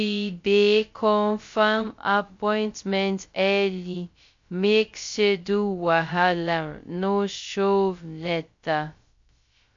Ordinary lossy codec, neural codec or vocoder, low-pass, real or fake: MP3, 48 kbps; codec, 16 kHz, 0.2 kbps, FocalCodec; 7.2 kHz; fake